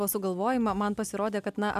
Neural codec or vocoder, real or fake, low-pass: none; real; 14.4 kHz